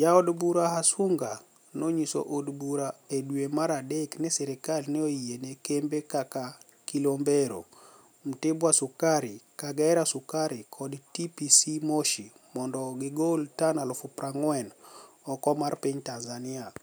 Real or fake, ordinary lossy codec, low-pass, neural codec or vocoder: real; none; none; none